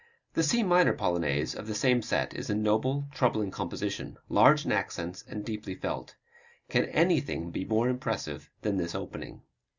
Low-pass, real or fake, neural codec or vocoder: 7.2 kHz; real; none